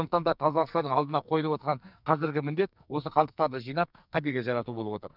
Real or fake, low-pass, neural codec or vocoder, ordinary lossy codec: fake; 5.4 kHz; codec, 44.1 kHz, 2.6 kbps, SNAC; none